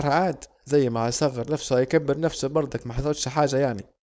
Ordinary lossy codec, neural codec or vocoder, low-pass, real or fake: none; codec, 16 kHz, 4.8 kbps, FACodec; none; fake